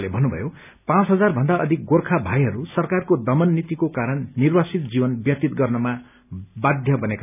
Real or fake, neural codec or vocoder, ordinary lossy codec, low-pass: real; none; none; 3.6 kHz